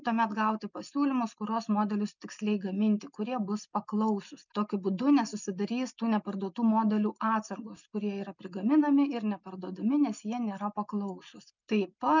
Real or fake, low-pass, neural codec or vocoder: real; 7.2 kHz; none